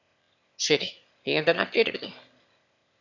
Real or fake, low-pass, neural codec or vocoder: fake; 7.2 kHz; autoencoder, 22.05 kHz, a latent of 192 numbers a frame, VITS, trained on one speaker